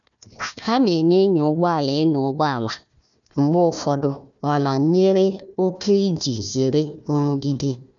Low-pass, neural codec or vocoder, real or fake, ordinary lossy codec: 7.2 kHz; codec, 16 kHz, 1 kbps, FunCodec, trained on Chinese and English, 50 frames a second; fake; none